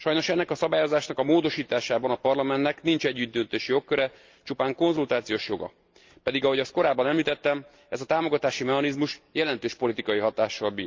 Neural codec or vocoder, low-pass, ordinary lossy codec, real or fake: none; 7.2 kHz; Opus, 24 kbps; real